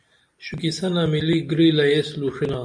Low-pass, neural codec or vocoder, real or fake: 9.9 kHz; none; real